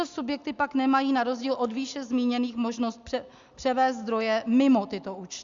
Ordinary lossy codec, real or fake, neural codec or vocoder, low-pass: Opus, 64 kbps; real; none; 7.2 kHz